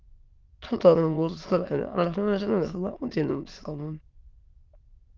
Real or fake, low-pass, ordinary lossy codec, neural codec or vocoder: fake; 7.2 kHz; Opus, 32 kbps; autoencoder, 22.05 kHz, a latent of 192 numbers a frame, VITS, trained on many speakers